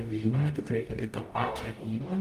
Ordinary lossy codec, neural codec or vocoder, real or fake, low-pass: Opus, 32 kbps; codec, 44.1 kHz, 0.9 kbps, DAC; fake; 14.4 kHz